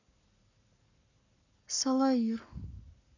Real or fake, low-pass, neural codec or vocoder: fake; 7.2 kHz; codec, 44.1 kHz, 7.8 kbps, Pupu-Codec